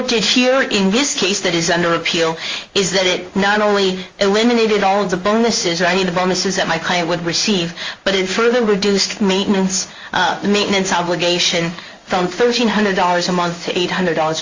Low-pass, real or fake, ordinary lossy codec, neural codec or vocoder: 7.2 kHz; fake; Opus, 32 kbps; codec, 16 kHz in and 24 kHz out, 1 kbps, XY-Tokenizer